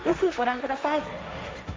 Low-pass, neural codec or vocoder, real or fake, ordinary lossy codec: none; codec, 16 kHz, 1.1 kbps, Voila-Tokenizer; fake; none